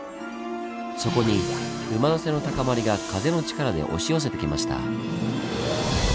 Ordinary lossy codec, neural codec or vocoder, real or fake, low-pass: none; none; real; none